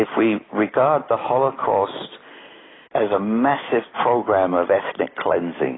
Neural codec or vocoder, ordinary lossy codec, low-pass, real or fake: none; AAC, 16 kbps; 7.2 kHz; real